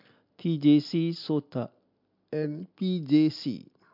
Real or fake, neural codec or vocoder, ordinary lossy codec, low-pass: real; none; MP3, 48 kbps; 5.4 kHz